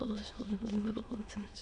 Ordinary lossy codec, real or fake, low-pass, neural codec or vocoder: MP3, 64 kbps; fake; 9.9 kHz; autoencoder, 22.05 kHz, a latent of 192 numbers a frame, VITS, trained on many speakers